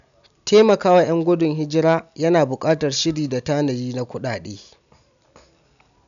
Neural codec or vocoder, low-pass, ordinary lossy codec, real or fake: none; 7.2 kHz; none; real